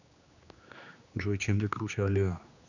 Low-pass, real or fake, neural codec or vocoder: 7.2 kHz; fake; codec, 16 kHz, 2 kbps, X-Codec, HuBERT features, trained on general audio